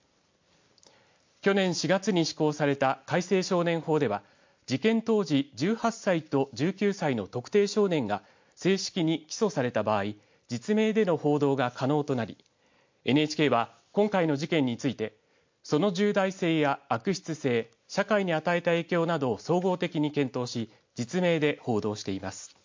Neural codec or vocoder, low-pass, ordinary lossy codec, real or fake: none; 7.2 kHz; MP3, 48 kbps; real